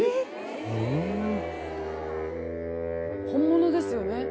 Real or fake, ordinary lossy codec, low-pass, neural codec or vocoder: real; none; none; none